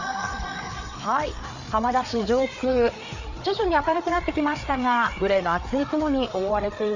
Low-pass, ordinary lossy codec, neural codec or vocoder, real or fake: 7.2 kHz; none; codec, 16 kHz, 4 kbps, FreqCodec, larger model; fake